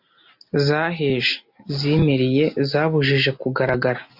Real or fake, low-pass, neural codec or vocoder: real; 5.4 kHz; none